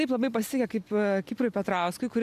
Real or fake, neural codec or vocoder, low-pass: real; none; 14.4 kHz